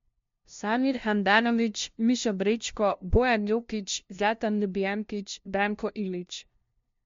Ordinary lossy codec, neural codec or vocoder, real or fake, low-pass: MP3, 48 kbps; codec, 16 kHz, 0.5 kbps, FunCodec, trained on LibriTTS, 25 frames a second; fake; 7.2 kHz